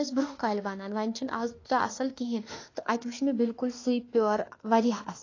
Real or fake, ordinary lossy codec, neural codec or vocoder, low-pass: fake; AAC, 32 kbps; autoencoder, 48 kHz, 32 numbers a frame, DAC-VAE, trained on Japanese speech; 7.2 kHz